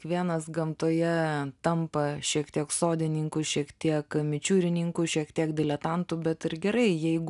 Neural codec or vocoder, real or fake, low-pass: none; real; 10.8 kHz